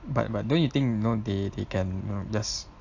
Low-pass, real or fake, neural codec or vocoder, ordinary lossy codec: 7.2 kHz; real; none; AAC, 48 kbps